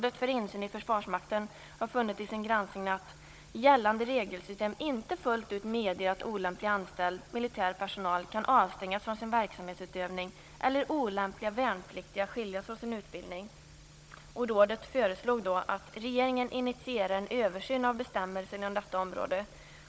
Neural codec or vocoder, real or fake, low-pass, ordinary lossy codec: codec, 16 kHz, 16 kbps, FunCodec, trained on Chinese and English, 50 frames a second; fake; none; none